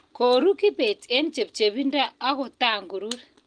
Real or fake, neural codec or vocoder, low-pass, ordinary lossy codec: real; none; 9.9 kHz; Opus, 24 kbps